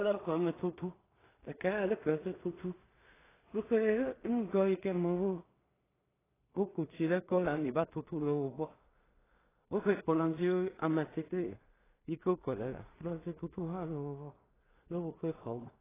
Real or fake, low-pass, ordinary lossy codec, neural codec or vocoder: fake; 3.6 kHz; AAC, 16 kbps; codec, 16 kHz in and 24 kHz out, 0.4 kbps, LongCat-Audio-Codec, two codebook decoder